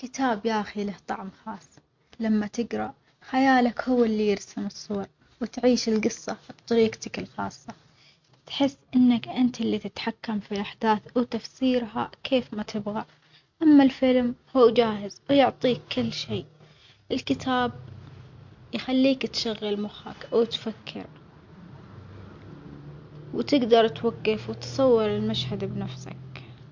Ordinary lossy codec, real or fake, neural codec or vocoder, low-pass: MP3, 48 kbps; real; none; 7.2 kHz